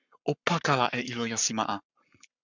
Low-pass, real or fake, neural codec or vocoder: 7.2 kHz; fake; codec, 44.1 kHz, 7.8 kbps, Pupu-Codec